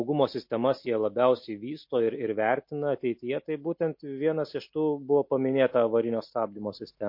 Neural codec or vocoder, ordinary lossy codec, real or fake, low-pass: none; MP3, 32 kbps; real; 5.4 kHz